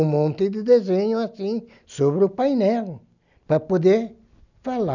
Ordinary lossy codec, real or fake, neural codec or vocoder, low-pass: none; real; none; 7.2 kHz